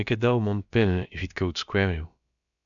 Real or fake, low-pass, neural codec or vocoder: fake; 7.2 kHz; codec, 16 kHz, about 1 kbps, DyCAST, with the encoder's durations